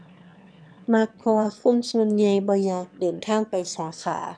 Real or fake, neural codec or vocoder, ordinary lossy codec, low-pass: fake; autoencoder, 22.05 kHz, a latent of 192 numbers a frame, VITS, trained on one speaker; none; 9.9 kHz